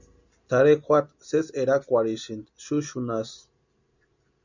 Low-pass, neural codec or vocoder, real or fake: 7.2 kHz; none; real